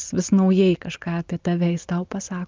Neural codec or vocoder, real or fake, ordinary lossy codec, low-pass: none; real; Opus, 32 kbps; 7.2 kHz